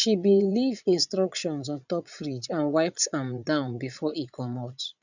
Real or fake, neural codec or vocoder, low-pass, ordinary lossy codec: fake; vocoder, 22.05 kHz, 80 mel bands, Vocos; 7.2 kHz; none